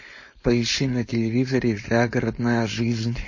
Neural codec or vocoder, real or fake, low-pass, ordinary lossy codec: codec, 16 kHz, 4.8 kbps, FACodec; fake; 7.2 kHz; MP3, 32 kbps